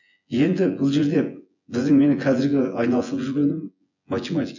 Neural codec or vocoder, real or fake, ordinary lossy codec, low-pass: vocoder, 24 kHz, 100 mel bands, Vocos; fake; none; 7.2 kHz